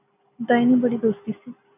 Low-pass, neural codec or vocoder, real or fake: 3.6 kHz; none; real